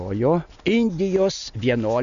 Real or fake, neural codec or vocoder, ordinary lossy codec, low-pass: real; none; MP3, 96 kbps; 7.2 kHz